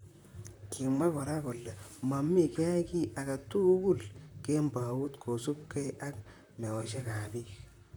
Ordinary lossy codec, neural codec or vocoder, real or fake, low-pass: none; vocoder, 44.1 kHz, 128 mel bands, Pupu-Vocoder; fake; none